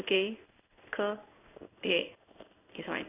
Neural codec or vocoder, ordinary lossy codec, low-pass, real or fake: codec, 16 kHz in and 24 kHz out, 1 kbps, XY-Tokenizer; none; 3.6 kHz; fake